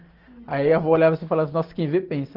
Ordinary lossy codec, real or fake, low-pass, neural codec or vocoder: Opus, 16 kbps; real; 5.4 kHz; none